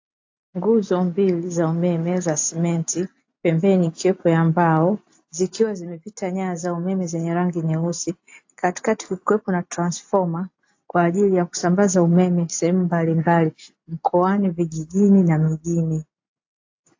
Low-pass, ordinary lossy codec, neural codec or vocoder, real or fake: 7.2 kHz; AAC, 48 kbps; none; real